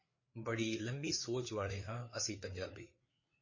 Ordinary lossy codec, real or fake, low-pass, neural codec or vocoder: MP3, 32 kbps; fake; 7.2 kHz; codec, 16 kHz, 4 kbps, FreqCodec, larger model